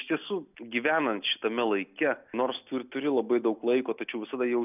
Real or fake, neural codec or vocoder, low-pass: real; none; 3.6 kHz